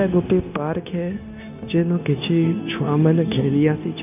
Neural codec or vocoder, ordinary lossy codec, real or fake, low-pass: codec, 16 kHz, 0.9 kbps, LongCat-Audio-Codec; none; fake; 3.6 kHz